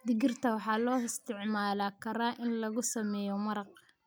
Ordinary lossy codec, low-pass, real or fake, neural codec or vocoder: none; none; real; none